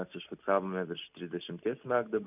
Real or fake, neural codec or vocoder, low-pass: real; none; 3.6 kHz